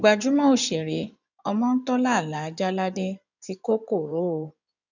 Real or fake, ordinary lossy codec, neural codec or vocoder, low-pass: fake; none; codec, 16 kHz in and 24 kHz out, 2.2 kbps, FireRedTTS-2 codec; 7.2 kHz